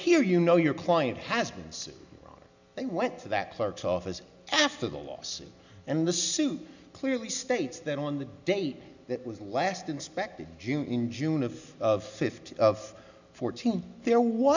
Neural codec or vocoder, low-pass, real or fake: none; 7.2 kHz; real